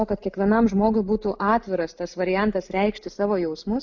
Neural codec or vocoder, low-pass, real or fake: none; 7.2 kHz; real